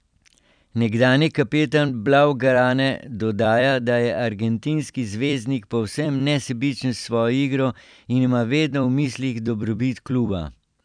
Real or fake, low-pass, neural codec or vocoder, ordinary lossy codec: fake; 9.9 kHz; vocoder, 44.1 kHz, 128 mel bands every 256 samples, BigVGAN v2; none